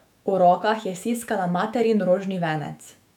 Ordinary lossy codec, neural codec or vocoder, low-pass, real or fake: none; autoencoder, 48 kHz, 128 numbers a frame, DAC-VAE, trained on Japanese speech; 19.8 kHz; fake